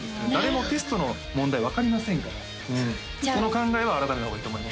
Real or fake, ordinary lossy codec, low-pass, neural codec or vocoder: real; none; none; none